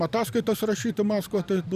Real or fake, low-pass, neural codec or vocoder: fake; 14.4 kHz; vocoder, 44.1 kHz, 128 mel bands every 512 samples, BigVGAN v2